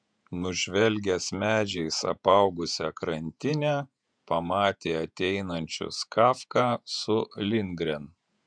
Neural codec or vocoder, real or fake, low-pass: vocoder, 48 kHz, 128 mel bands, Vocos; fake; 9.9 kHz